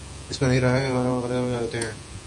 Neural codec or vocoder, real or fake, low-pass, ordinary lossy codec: vocoder, 48 kHz, 128 mel bands, Vocos; fake; 10.8 kHz; MP3, 48 kbps